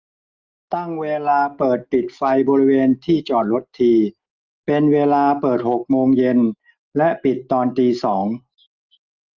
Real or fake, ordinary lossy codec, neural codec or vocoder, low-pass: real; Opus, 24 kbps; none; 7.2 kHz